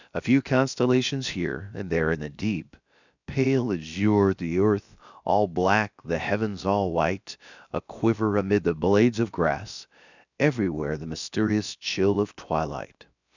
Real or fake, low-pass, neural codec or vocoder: fake; 7.2 kHz; codec, 16 kHz, about 1 kbps, DyCAST, with the encoder's durations